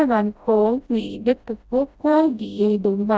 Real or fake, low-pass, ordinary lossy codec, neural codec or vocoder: fake; none; none; codec, 16 kHz, 0.5 kbps, FreqCodec, smaller model